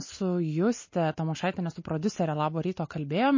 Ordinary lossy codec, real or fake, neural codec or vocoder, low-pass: MP3, 32 kbps; real; none; 7.2 kHz